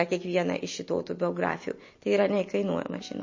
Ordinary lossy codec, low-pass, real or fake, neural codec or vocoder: MP3, 32 kbps; 7.2 kHz; real; none